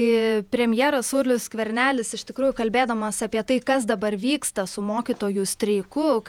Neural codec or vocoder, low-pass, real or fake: vocoder, 48 kHz, 128 mel bands, Vocos; 19.8 kHz; fake